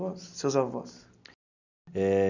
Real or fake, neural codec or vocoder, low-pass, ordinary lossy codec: real; none; 7.2 kHz; none